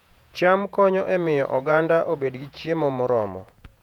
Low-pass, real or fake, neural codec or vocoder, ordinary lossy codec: 19.8 kHz; fake; vocoder, 48 kHz, 128 mel bands, Vocos; none